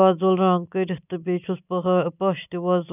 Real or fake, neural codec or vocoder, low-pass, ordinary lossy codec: real; none; 3.6 kHz; none